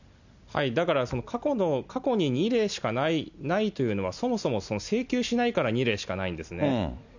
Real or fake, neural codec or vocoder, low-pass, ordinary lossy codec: real; none; 7.2 kHz; none